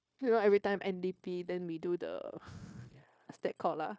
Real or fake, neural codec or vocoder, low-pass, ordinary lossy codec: fake; codec, 16 kHz, 0.9 kbps, LongCat-Audio-Codec; none; none